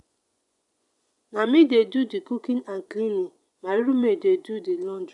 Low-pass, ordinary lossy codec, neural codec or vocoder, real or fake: 10.8 kHz; none; vocoder, 24 kHz, 100 mel bands, Vocos; fake